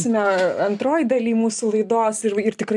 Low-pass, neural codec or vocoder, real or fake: 10.8 kHz; none; real